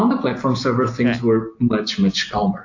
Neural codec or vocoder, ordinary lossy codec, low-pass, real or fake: none; AAC, 48 kbps; 7.2 kHz; real